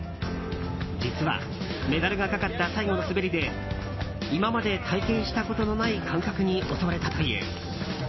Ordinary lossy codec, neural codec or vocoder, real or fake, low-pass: MP3, 24 kbps; none; real; 7.2 kHz